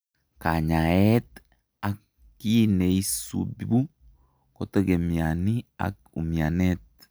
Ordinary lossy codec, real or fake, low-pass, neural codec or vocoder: none; real; none; none